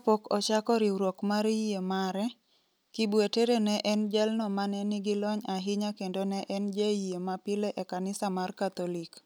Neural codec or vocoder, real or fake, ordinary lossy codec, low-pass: none; real; none; none